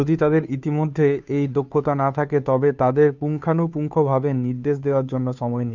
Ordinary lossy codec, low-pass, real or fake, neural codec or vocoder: none; 7.2 kHz; fake; codec, 16 kHz, 2 kbps, FunCodec, trained on Chinese and English, 25 frames a second